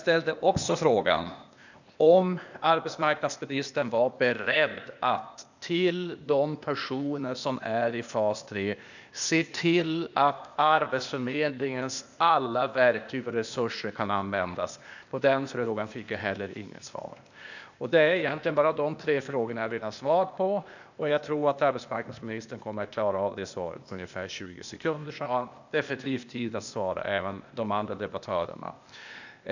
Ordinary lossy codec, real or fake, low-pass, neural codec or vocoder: none; fake; 7.2 kHz; codec, 16 kHz, 0.8 kbps, ZipCodec